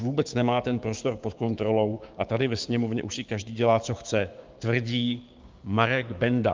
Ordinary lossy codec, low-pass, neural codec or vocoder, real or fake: Opus, 32 kbps; 7.2 kHz; codec, 16 kHz, 6 kbps, DAC; fake